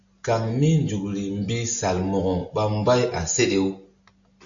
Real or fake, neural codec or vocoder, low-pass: real; none; 7.2 kHz